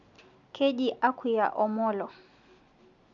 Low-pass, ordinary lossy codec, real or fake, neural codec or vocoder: 7.2 kHz; none; real; none